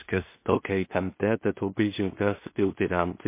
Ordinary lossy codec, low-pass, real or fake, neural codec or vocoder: MP3, 24 kbps; 3.6 kHz; fake; codec, 16 kHz in and 24 kHz out, 0.4 kbps, LongCat-Audio-Codec, two codebook decoder